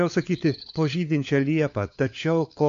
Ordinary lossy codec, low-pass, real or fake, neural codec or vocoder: AAC, 64 kbps; 7.2 kHz; fake; codec, 16 kHz, 4 kbps, FunCodec, trained on LibriTTS, 50 frames a second